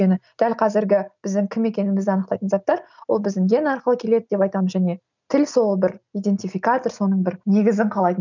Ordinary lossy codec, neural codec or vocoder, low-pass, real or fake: none; none; 7.2 kHz; real